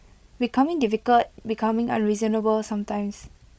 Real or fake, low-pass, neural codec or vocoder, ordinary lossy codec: fake; none; codec, 16 kHz, 16 kbps, FreqCodec, larger model; none